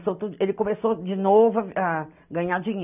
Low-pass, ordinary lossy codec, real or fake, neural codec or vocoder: 3.6 kHz; none; real; none